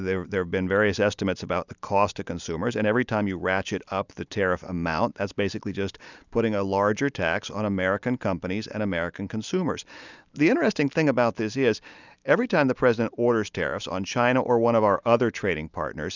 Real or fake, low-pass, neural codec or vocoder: real; 7.2 kHz; none